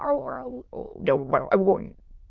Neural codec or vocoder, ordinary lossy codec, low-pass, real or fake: autoencoder, 22.05 kHz, a latent of 192 numbers a frame, VITS, trained on many speakers; Opus, 24 kbps; 7.2 kHz; fake